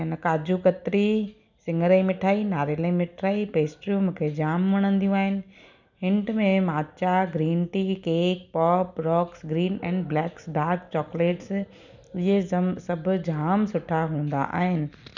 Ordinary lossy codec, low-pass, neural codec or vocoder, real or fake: none; 7.2 kHz; none; real